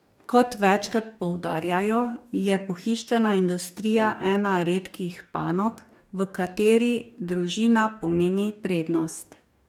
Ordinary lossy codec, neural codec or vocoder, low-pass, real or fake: none; codec, 44.1 kHz, 2.6 kbps, DAC; 19.8 kHz; fake